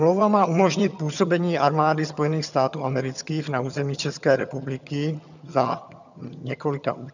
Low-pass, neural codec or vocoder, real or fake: 7.2 kHz; vocoder, 22.05 kHz, 80 mel bands, HiFi-GAN; fake